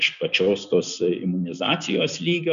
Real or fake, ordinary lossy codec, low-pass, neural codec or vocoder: real; MP3, 96 kbps; 7.2 kHz; none